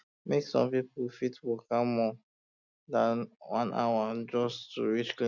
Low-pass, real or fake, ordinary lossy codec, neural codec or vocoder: 7.2 kHz; real; none; none